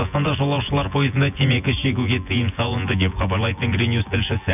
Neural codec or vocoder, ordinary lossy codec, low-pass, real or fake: vocoder, 24 kHz, 100 mel bands, Vocos; none; 3.6 kHz; fake